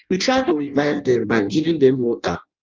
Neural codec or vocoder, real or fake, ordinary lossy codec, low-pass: codec, 16 kHz in and 24 kHz out, 0.6 kbps, FireRedTTS-2 codec; fake; Opus, 24 kbps; 7.2 kHz